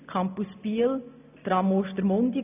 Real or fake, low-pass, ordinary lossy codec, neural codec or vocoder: real; 3.6 kHz; none; none